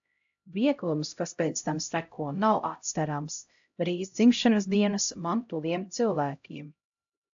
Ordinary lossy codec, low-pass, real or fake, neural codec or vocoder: AAC, 64 kbps; 7.2 kHz; fake; codec, 16 kHz, 0.5 kbps, X-Codec, HuBERT features, trained on LibriSpeech